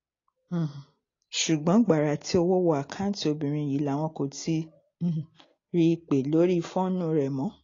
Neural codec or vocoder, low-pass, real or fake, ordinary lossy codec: none; 7.2 kHz; real; AAC, 32 kbps